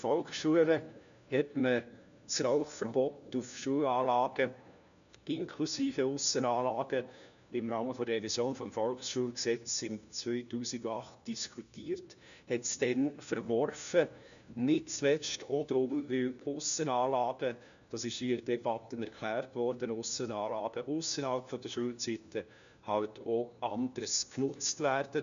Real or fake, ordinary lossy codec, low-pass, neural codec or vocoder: fake; none; 7.2 kHz; codec, 16 kHz, 1 kbps, FunCodec, trained on LibriTTS, 50 frames a second